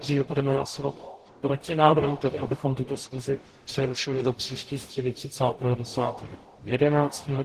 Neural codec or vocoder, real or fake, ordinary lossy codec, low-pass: codec, 44.1 kHz, 0.9 kbps, DAC; fake; Opus, 16 kbps; 14.4 kHz